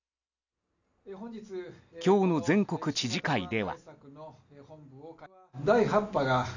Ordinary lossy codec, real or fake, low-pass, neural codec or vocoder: none; real; 7.2 kHz; none